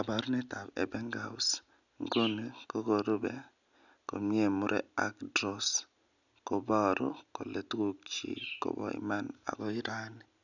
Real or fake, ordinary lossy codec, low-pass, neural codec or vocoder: real; none; 7.2 kHz; none